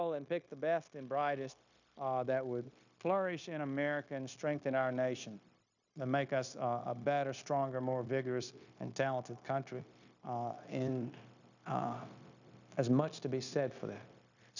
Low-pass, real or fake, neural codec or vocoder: 7.2 kHz; fake; codec, 16 kHz, 0.9 kbps, LongCat-Audio-Codec